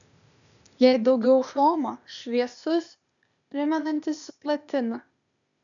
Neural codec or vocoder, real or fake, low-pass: codec, 16 kHz, 0.8 kbps, ZipCodec; fake; 7.2 kHz